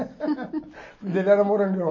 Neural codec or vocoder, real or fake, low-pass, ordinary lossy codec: none; real; 7.2 kHz; MP3, 32 kbps